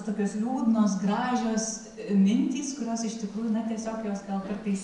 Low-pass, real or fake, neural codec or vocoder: 10.8 kHz; real; none